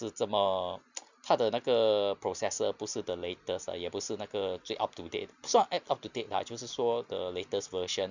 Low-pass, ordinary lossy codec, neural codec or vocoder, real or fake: 7.2 kHz; none; none; real